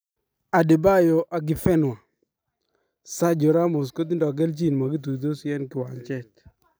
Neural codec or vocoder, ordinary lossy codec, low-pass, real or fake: none; none; none; real